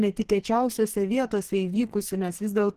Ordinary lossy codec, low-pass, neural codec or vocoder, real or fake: Opus, 16 kbps; 14.4 kHz; codec, 44.1 kHz, 2.6 kbps, SNAC; fake